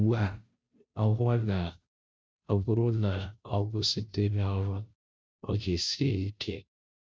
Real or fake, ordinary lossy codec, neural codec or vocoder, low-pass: fake; none; codec, 16 kHz, 0.5 kbps, FunCodec, trained on Chinese and English, 25 frames a second; none